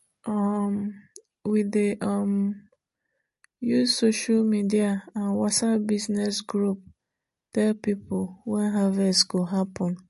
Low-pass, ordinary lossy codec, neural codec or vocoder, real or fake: 14.4 kHz; MP3, 48 kbps; none; real